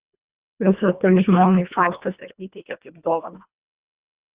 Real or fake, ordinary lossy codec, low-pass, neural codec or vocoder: fake; Opus, 64 kbps; 3.6 kHz; codec, 24 kHz, 1.5 kbps, HILCodec